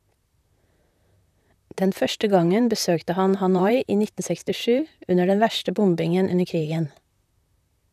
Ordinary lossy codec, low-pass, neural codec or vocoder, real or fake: none; 14.4 kHz; vocoder, 44.1 kHz, 128 mel bands, Pupu-Vocoder; fake